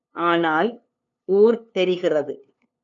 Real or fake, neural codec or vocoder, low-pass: fake; codec, 16 kHz, 2 kbps, FunCodec, trained on LibriTTS, 25 frames a second; 7.2 kHz